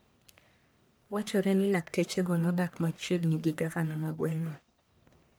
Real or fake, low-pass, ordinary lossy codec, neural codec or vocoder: fake; none; none; codec, 44.1 kHz, 1.7 kbps, Pupu-Codec